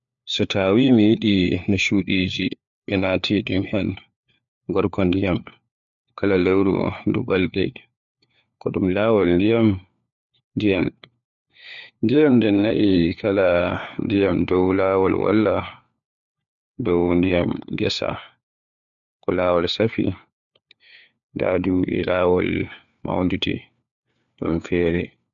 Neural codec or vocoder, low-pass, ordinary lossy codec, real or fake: codec, 16 kHz, 4 kbps, FunCodec, trained on LibriTTS, 50 frames a second; 7.2 kHz; MP3, 64 kbps; fake